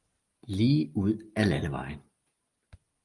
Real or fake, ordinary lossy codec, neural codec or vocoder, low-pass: real; Opus, 32 kbps; none; 10.8 kHz